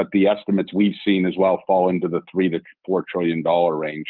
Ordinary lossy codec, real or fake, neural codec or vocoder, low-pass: Opus, 24 kbps; real; none; 5.4 kHz